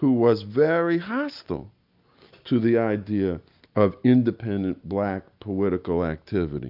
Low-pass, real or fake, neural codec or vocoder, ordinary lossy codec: 5.4 kHz; real; none; AAC, 48 kbps